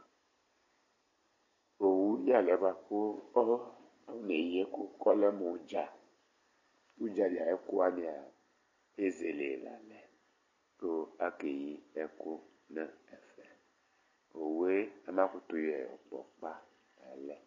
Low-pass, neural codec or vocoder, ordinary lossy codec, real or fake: 7.2 kHz; none; MP3, 32 kbps; real